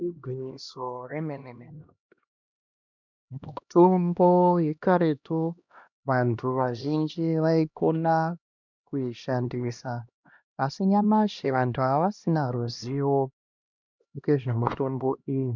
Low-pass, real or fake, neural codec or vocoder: 7.2 kHz; fake; codec, 16 kHz, 1 kbps, X-Codec, HuBERT features, trained on LibriSpeech